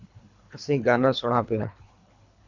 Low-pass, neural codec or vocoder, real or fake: 7.2 kHz; codec, 24 kHz, 3 kbps, HILCodec; fake